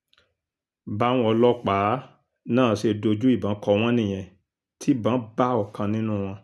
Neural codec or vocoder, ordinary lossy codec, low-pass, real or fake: none; none; none; real